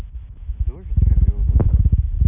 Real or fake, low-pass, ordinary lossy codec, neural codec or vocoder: real; 3.6 kHz; none; none